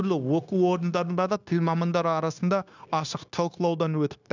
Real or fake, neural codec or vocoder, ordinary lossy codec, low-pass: fake; codec, 16 kHz, 0.9 kbps, LongCat-Audio-Codec; none; 7.2 kHz